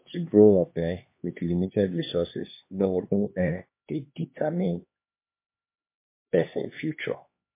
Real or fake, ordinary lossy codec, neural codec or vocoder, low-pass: fake; MP3, 24 kbps; codec, 16 kHz, 2 kbps, X-Codec, HuBERT features, trained on LibriSpeech; 3.6 kHz